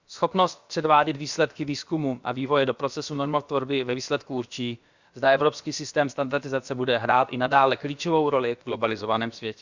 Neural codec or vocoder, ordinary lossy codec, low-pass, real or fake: codec, 16 kHz, about 1 kbps, DyCAST, with the encoder's durations; Opus, 64 kbps; 7.2 kHz; fake